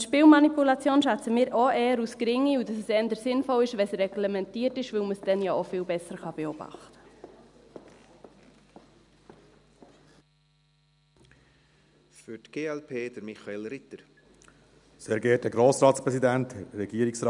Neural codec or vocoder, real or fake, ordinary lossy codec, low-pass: none; real; none; 10.8 kHz